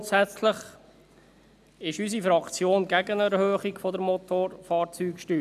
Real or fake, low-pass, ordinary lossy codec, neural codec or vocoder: real; 14.4 kHz; none; none